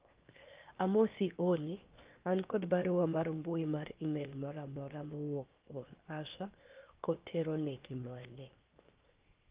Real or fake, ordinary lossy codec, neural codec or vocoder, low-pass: fake; Opus, 32 kbps; codec, 16 kHz, 0.7 kbps, FocalCodec; 3.6 kHz